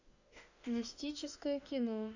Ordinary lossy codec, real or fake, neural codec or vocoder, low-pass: none; fake; autoencoder, 48 kHz, 32 numbers a frame, DAC-VAE, trained on Japanese speech; 7.2 kHz